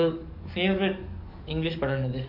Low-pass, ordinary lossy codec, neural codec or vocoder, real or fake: 5.4 kHz; none; codec, 44.1 kHz, 7.8 kbps, DAC; fake